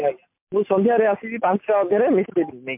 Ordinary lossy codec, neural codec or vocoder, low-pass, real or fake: MP3, 32 kbps; none; 3.6 kHz; real